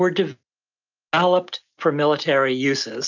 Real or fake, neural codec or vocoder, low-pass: real; none; 7.2 kHz